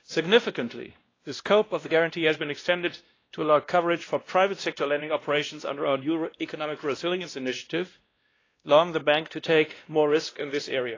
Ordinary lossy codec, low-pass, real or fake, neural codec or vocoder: AAC, 32 kbps; 7.2 kHz; fake; codec, 16 kHz, 1 kbps, X-Codec, WavLM features, trained on Multilingual LibriSpeech